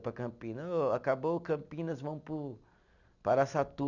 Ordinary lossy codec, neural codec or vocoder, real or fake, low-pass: none; none; real; 7.2 kHz